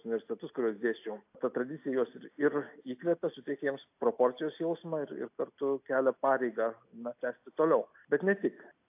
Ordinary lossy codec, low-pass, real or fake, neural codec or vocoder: AAC, 32 kbps; 3.6 kHz; real; none